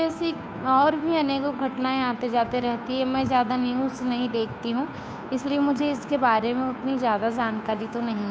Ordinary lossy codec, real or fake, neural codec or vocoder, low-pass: none; fake; codec, 16 kHz, 2 kbps, FunCodec, trained on Chinese and English, 25 frames a second; none